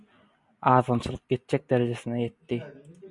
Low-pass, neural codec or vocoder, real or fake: 10.8 kHz; none; real